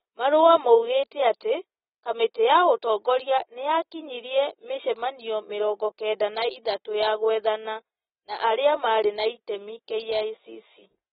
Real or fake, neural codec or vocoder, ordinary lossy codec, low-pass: real; none; AAC, 16 kbps; 19.8 kHz